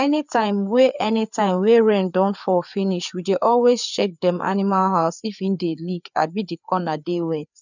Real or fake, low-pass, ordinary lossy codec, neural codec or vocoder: fake; 7.2 kHz; none; codec, 16 kHz, 4 kbps, FreqCodec, larger model